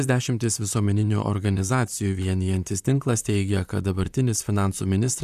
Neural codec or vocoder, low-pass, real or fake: vocoder, 44.1 kHz, 128 mel bands, Pupu-Vocoder; 14.4 kHz; fake